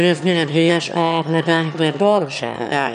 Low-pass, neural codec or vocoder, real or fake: 9.9 kHz; autoencoder, 22.05 kHz, a latent of 192 numbers a frame, VITS, trained on one speaker; fake